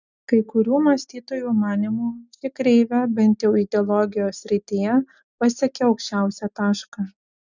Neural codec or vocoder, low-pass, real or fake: none; 7.2 kHz; real